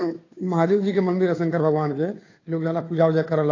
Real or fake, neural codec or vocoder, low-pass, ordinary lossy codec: fake; codec, 16 kHz, 2 kbps, FunCodec, trained on Chinese and English, 25 frames a second; 7.2 kHz; AAC, 32 kbps